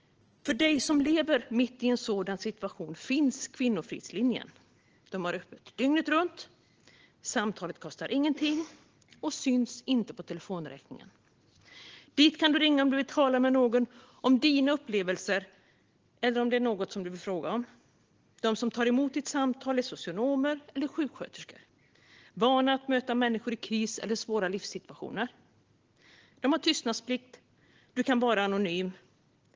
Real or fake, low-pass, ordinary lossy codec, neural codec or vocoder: real; 7.2 kHz; Opus, 16 kbps; none